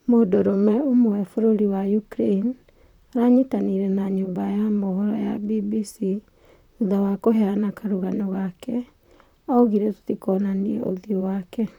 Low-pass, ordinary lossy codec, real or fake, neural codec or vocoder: 19.8 kHz; none; fake; vocoder, 44.1 kHz, 128 mel bands, Pupu-Vocoder